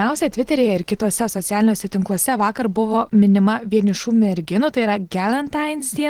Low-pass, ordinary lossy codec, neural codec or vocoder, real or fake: 19.8 kHz; Opus, 16 kbps; vocoder, 44.1 kHz, 128 mel bands every 512 samples, BigVGAN v2; fake